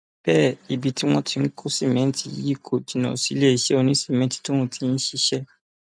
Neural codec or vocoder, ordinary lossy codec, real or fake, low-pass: none; none; real; 9.9 kHz